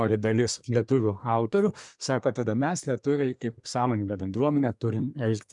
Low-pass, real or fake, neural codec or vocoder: 10.8 kHz; fake; codec, 24 kHz, 1 kbps, SNAC